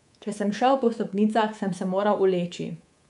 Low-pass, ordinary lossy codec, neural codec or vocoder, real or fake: 10.8 kHz; none; codec, 24 kHz, 3.1 kbps, DualCodec; fake